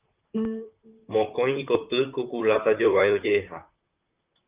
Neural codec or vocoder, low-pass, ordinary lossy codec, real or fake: vocoder, 44.1 kHz, 128 mel bands, Pupu-Vocoder; 3.6 kHz; Opus, 24 kbps; fake